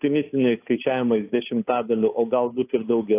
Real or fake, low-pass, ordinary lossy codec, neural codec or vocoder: fake; 3.6 kHz; AAC, 24 kbps; codec, 16 kHz, 2 kbps, FunCodec, trained on Chinese and English, 25 frames a second